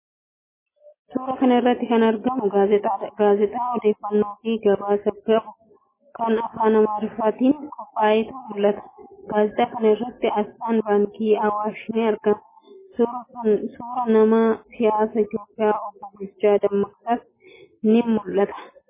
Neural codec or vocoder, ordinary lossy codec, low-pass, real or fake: none; MP3, 16 kbps; 3.6 kHz; real